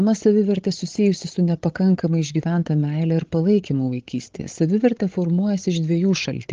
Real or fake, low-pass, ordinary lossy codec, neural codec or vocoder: fake; 7.2 kHz; Opus, 16 kbps; codec, 16 kHz, 16 kbps, FreqCodec, larger model